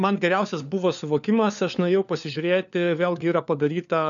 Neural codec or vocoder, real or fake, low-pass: codec, 16 kHz, 4 kbps, FunCodec, trained on LibriTTS, 50 frames a second; fake; 7.2 kHz